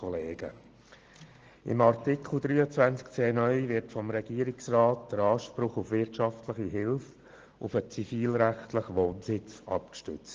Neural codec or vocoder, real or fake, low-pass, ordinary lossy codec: none; real; 7.2 kHz; Opus, 16 kbps